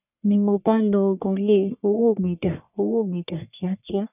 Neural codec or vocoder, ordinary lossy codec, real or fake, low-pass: codec, 44.1 kHz, 1.7 kbps, Pupu-Codec; none; fake; 3.6 kHz